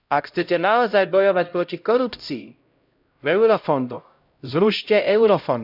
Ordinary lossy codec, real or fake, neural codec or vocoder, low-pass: none; fake; codec, 16 kHz, 0.5 kbps, X-Codec, HuBERT features, trained on LibriSpeech; 5.4 kHz